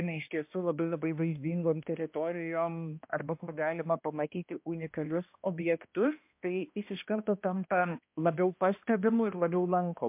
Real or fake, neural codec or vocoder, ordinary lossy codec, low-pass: fake; codec, 16 kHz, 1 kbps, X-Codec, HuBERT features, trained on balanced general audio; MP3, 32 kbps; 3.6 kHz